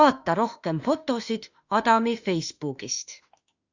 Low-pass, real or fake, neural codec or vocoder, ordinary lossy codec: 7.2 kHz; fake; autoencoder, 48 kHz, 32 numbers a frame, DAC-VAE, trained on Japanese speech; Opus, 64 kbps